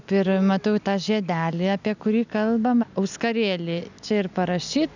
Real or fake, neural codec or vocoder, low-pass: real; none; 7.2 kHz